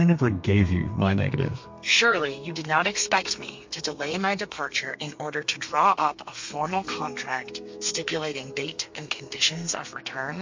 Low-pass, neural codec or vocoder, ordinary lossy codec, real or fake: 7.2 kHz; codec, 32 kHz, 1.9 kbps, SNAC; MP3, 48 kbps; fake